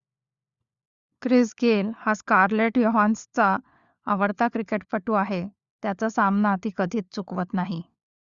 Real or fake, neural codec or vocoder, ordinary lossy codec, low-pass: fake; codec, 16 kHz, 4 kbps, FunCodec, trained on LibriTTS, 50 frames a second; Opus, 64 kbps; 7.2 kHz